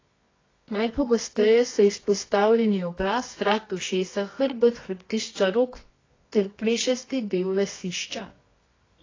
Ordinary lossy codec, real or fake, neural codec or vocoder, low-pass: AAC, 32 kbps; fake; codec, 24 kHz, 0.9 kbps, WavTokenizer, medium music audio release; 7.2 kHz